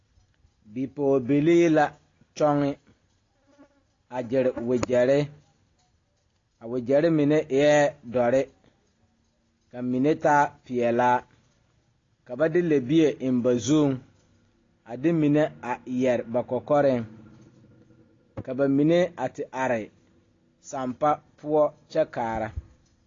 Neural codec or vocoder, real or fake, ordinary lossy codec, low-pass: none; real; AAC, 32 kbps; 7.2 kHz